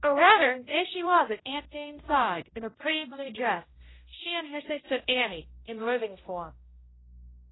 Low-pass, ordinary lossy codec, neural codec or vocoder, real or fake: 7.2 kHz; AAC, 16 kbps; codec, 16 kHz, 0.5 kbps, X-Codec, HuBERT features, trained on general audio; fake